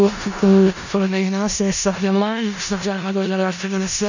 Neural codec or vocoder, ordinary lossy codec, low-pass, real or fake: codec, 16 kHz in and 24 kHz out, 0.4 kbps, LongCat-Audio-Codec, four codebook decoder; none; 7.2 kHz; fake